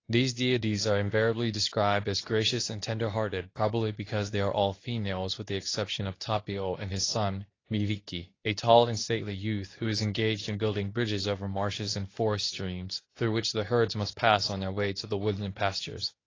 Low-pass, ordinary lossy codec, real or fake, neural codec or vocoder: 7.2 kHz; AAC, 32 kbps; fake; codec, 24 kHz, 0.9 kbps, WavTokenizer, medium speech release version 2